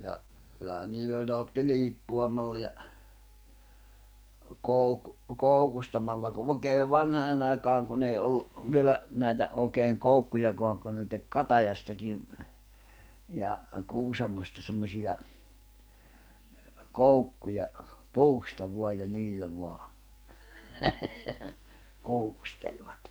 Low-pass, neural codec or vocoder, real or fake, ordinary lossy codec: none; codec, 44.1 kHz, 2.6 kbps, SNAC; fake; none